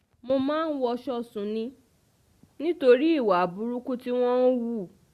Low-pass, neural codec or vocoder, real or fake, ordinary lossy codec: 14.4 kHz; none; real; Opus, 64 kbps